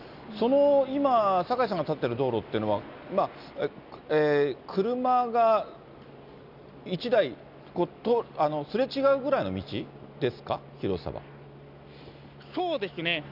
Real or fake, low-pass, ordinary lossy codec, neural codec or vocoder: real; 5.4 kHz; none; none